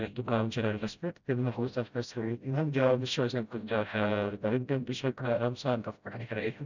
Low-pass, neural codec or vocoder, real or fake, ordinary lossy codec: 7.2 kHz; codec, 16 kHz, 0.5 kbps, FreqCodec, smaller model; fake; none